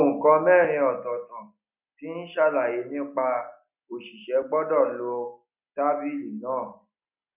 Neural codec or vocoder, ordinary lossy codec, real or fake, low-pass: none; none; real; 3.6 kHz